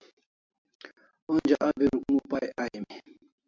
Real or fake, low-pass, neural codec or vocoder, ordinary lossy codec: real; 7.2 kHz; none; MP3, 64 kbps